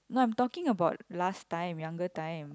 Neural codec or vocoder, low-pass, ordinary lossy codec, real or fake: none; none; none; real